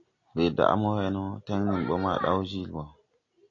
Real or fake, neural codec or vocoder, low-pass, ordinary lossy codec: real; none; 7.2 kHz; AAC, 32 kbps